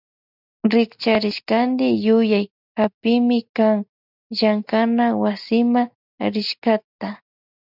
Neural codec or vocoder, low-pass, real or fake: none; 5.4 kHz; real